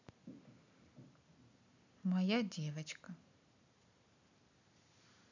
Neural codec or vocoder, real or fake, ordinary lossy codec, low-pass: none; real; none; 7.2 kHz